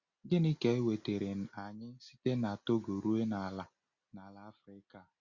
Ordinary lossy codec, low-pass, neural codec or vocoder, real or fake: Opus, 64 kbps; 7.2 kHz; none; real